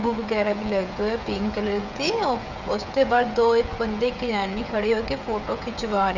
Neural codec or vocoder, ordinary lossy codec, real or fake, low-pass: codec, 16 kHz, 16 kbps, FreqCodec, smaller model; none; fake; 7.2 kHz